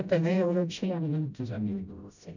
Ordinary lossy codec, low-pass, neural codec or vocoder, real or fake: none; 7.2 kHz; codec, 16 kHz, 0.5 kbps, FreqCodec, smaller model; fake